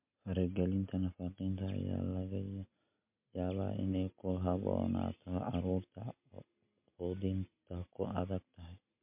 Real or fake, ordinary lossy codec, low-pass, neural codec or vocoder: real; MP3, 32 kbps; 3.6 kHz; none